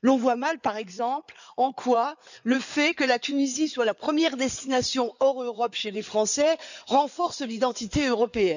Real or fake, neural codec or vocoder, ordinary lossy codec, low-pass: fake; codec, 16 kHz in and 24 kHz out, 2.2 kbps, FireRedTTS-2 codec; none; 7.2 kHz